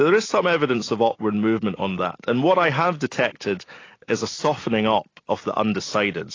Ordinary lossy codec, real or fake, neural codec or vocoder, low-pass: AAC, 32 kbps; real; none; 7.2 kHz